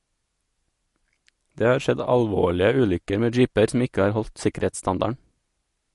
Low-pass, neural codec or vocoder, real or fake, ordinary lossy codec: 14.4 kHz; none; real; MP3, 48 kbps